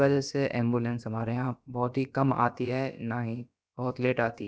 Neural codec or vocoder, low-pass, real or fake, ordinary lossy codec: codec, 16 kHz, about 1 kbps, DyCAST, with the encoder's durations; none; fake; none